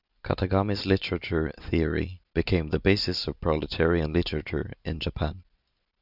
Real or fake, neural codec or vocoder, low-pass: real; none; 5.4 kHz